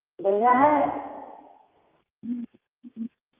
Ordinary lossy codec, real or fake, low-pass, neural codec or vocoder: Opus, 32 kbps; fake; 3.6 kHz; codec, 32 kHz, 1.9 kbps, SNAC